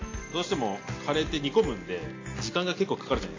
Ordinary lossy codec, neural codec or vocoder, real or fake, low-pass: AAC, 32 kbps; none; real; 7.2 kHz